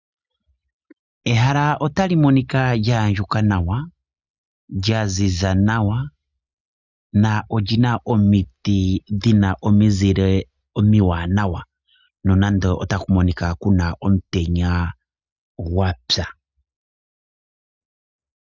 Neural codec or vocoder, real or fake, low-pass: none; real; 7.2 kHz